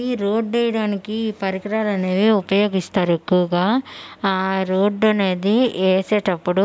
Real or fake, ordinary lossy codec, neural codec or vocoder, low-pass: real; none; none; none